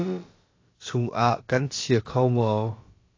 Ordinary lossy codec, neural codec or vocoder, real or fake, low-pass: AAC, 32 kbps; codec, 16 kHz, about 1 kbps, DyCAST, with the encoder's durations; fake; 7.2 kHz